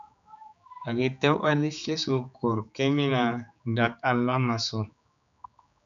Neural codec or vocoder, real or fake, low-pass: codec, 16 kHz, 4 kbps, X-Codec, HuBERT features, trained on general audio; fake; 7.2 kHz